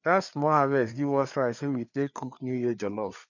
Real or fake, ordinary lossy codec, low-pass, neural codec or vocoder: fake; none; 7.2 kHz; codec, 16 kHz, 4 kbps, FreqCodec, larger model